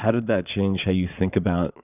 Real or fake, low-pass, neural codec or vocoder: fake; 3.6 kHz; vocoder, 22.05 kHz, 80 mel bands, Vocos